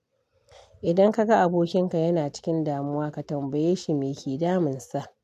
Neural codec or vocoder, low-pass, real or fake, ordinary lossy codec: none; 14.4 kHz; real; MP3, 96 kbps